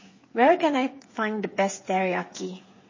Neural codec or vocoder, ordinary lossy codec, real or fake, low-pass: codec, 16 kHz, 8 kbps, FreqCodec, smaller model; MP3, 32 kbps; fake; 7.2 kHz